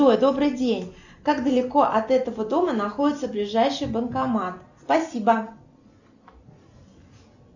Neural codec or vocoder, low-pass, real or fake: none; 7.2 kHz; real